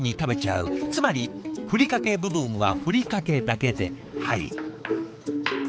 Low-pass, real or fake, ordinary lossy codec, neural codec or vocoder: none; fake; none; codec, 16 kHz, 4 kbps, X-Codec, HuBERT features, trained on balanced general audio